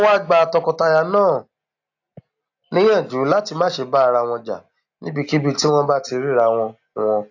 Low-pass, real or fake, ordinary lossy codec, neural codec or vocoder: 7.2 kHz; real; AAC, 48 kbps; none